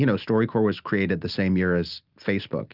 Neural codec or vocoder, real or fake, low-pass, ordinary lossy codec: none; real; 5.4 kHz; Opus, 24 kbps